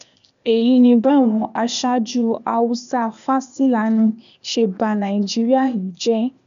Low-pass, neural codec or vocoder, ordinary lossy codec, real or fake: 7.2 kHz; codec, 16 kHz, 0.8 kbps, ZipCodec; none; fake